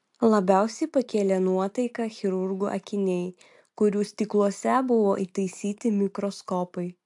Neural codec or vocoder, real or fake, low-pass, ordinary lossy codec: none; real; 10.8 kHz; AAC, 64 kbps